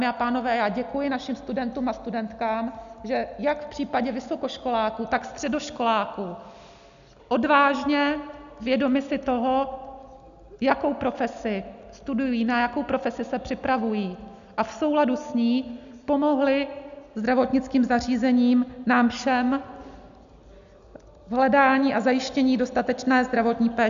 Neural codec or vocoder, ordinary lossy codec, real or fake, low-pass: none; AAC, 96 kbps; real; 7.2 kHz